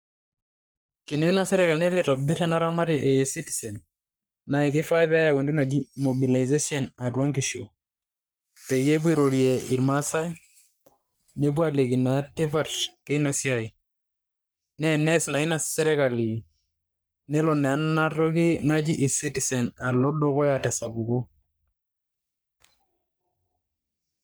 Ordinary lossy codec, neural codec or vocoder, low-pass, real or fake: none; codec, 44.1 kHz, 3.4 kbps, Pupu-Codec; none; fake